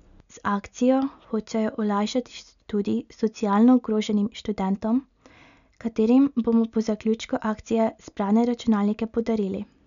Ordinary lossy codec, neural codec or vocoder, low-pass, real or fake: none; none; 7.2 kHz; real